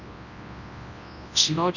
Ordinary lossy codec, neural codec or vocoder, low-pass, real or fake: none; codec, 24 kHz, 0.9 kbps, WavTokenizer, large speech release; 7.2 kHz; fake